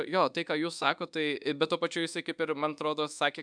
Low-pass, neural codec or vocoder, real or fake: 9.9 kHz; codec, 24 kHz, 1.2 kbps, DualCodec; fake